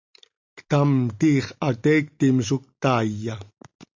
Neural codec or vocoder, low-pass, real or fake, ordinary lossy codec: none; 7.2 kHz; real; MP3, 64 kbps